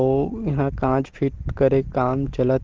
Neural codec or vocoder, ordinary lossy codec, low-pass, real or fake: none; Opus, 16 kbps; 7.2 kHz; real